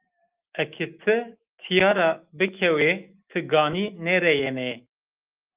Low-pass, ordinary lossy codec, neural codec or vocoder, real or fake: 3.6 kHz; Opus, 24 kbps; autoencoder, 48 kHz, 128 numbers a frame, DAC-VAE, trained on Japanese speech; fake